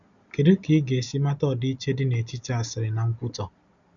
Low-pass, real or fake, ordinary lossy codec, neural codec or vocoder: 7.2 kHz; real; none; none